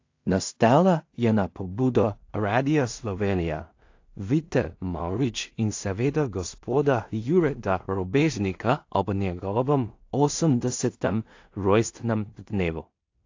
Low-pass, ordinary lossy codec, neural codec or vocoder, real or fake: 7.2 kHz; AAC, 48 kbps; codec, 16 kHz in and 24 kHz out, 0.4 kbps, LongCat-Audio-Codec, two codebook decoder; fake